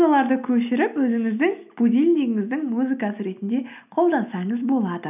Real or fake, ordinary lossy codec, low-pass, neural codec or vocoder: real; none; 3.6 kHz; none